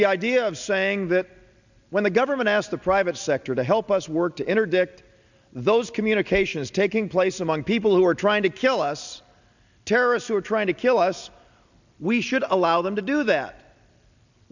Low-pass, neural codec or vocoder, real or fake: 7.2 kHz; none; real